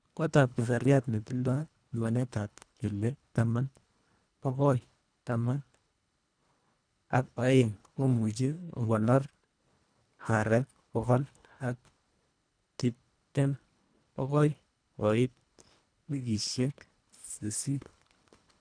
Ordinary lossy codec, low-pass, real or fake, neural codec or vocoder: none; 9.9 kHz; fake; codec, 24 kHz, 1.5 kbps, HILCodec